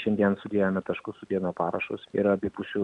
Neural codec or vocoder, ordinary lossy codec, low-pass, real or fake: none; MP3, 96 kbps; 10.8 kHz; real